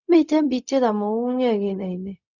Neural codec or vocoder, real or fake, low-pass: codec, 16 kHz, 0.4 kbps, LongCat-Audio-Codec; fake; 7.2 kHz